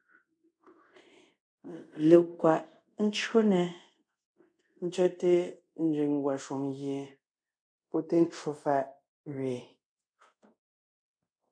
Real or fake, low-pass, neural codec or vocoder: fake; 9.9 kHz; codec, 24 kHz, 0.5 kbps, DualCodec